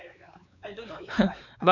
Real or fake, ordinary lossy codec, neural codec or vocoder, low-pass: fake; none; codec, 16 kHz, 4 kbps, X-Codec, WavLM features, trained on Multilingual LibriSpeech; 7.2 kHz